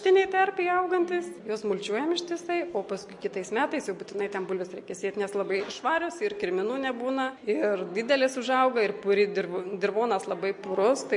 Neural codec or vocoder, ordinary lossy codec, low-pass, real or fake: none; MP3, 48 kbps; 10.8 kHz; real